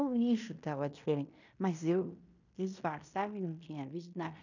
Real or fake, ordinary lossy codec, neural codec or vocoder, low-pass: fake; none; codec, 16 kHz in and 24 kHz out, 0.9 kbps, LongCat-Audio-Codec, fine tuned four codebook decoder; 7.2 kHz